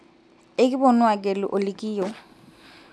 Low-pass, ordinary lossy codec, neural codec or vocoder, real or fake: none; none; none; real